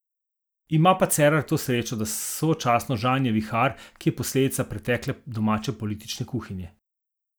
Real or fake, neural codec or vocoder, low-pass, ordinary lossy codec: real; none; none; none